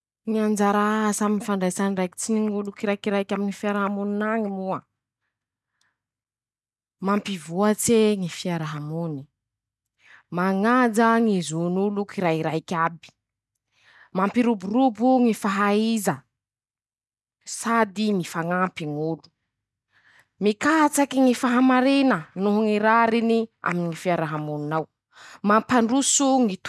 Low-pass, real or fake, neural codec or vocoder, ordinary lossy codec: none; real; none; none